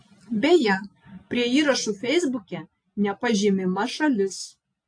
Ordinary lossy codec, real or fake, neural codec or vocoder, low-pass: AAC, 48 kbps; real; none; 9.9 kHz